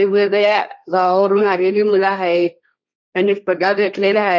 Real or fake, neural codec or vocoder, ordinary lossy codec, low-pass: fake; codec, 16 kHz, 1.1 kbps, Voila-Tokenizer; none; none